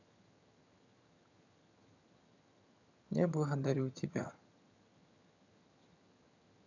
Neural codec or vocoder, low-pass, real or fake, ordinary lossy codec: vocoder, 22.05 kHz, 80 mel bands, HiFi-GAN; 7.2 kHz; fake; none